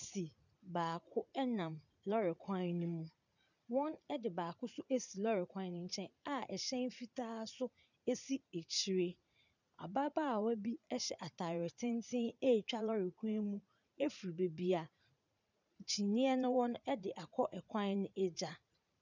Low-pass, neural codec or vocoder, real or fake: 7.2 kHz; vocoder, 44.1 kHz, 80 mel bands, Vocos; fake